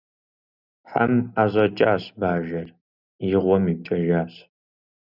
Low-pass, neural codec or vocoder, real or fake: 5.4 kHz; none; real